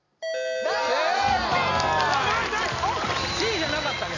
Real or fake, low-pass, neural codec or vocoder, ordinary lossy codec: real; 7.2 kHz; none; none